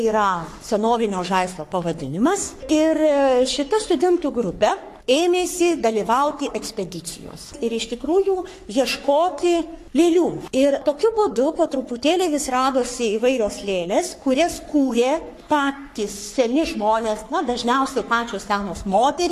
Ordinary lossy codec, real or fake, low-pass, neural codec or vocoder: AAC, 64 kbps; fake; 14.4 kHz; codec, 44.1 kHz, 3.4 kbps, Pupu-Codec